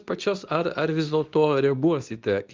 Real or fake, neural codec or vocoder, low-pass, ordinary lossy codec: fake; codec, 24 kHz, 0.9 kbps, WavTokenizer, medium speech release version 2; 7.2 kHz; Opus, 32 kbps